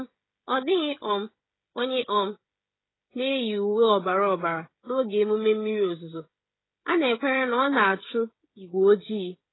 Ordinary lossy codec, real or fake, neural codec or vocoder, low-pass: AAC, 16 kbps; fake; codec, 16 kHz, 16 kbps, FreqCodec, smaller model; 7.2 kHz